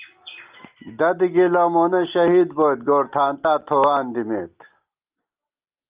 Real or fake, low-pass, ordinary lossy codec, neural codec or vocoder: real; 3.6 kHz; Opus, 32 kbps; none